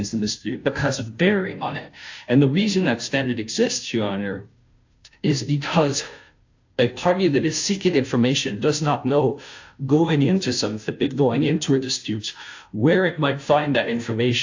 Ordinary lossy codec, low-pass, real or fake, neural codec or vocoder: AAC, 48 kbps; 7.2 kHz; fake; codec, 16 kHz, 0.5 kbps, FunCodec, trained on Chinese and English, 25 frames a second